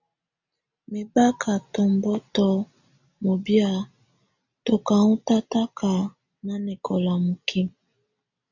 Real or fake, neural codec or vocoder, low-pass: real; none; 7.2 kHz